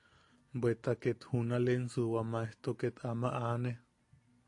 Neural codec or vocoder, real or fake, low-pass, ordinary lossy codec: none; real; 10.8 kHz; AAC, 48 kbps